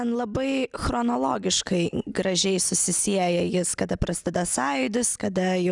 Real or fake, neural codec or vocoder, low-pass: real; none; 10.8 kHz